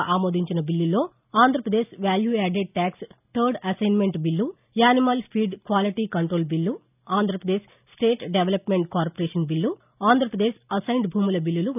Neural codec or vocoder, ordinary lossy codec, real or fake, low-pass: none; none; real; 3.6 kHz